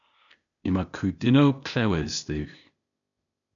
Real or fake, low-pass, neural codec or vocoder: fake; 7.2 kHz; codec, 16 kHz, 0.8 kbps, ZipCodec